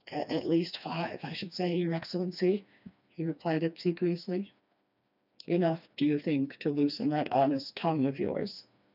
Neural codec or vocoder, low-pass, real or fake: codec, 16 kHz, 2 kbps, FreqCodec, smaller model; 5.4 kHz; fake